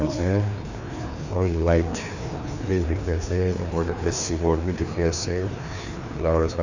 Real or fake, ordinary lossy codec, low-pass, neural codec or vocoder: fake; none; 7.2 kHz; codec, 16 kHz, 2 kbps, FreqCodec, larger model